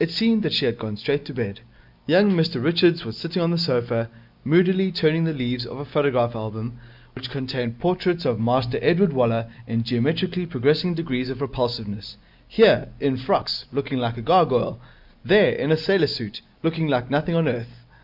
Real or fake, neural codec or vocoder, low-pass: real; none; 5.4 kHz